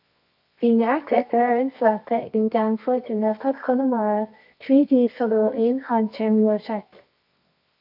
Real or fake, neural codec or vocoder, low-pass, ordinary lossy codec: fake; codec, 24 kHz, 0.9 kbps, WavTokenizer, medium music audio release; 5.4 kHz; AAC, 48 kbps